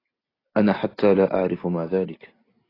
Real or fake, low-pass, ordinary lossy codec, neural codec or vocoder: real; 5.4 kHz; AAC, 24 kbps; none